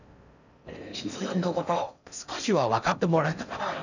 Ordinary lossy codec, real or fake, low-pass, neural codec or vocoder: none; fake; 7.2 kHz; codec, 16 kHz in and 24 kHz out, 0.6 kbps, FocalCodec, streaming, 4096 codes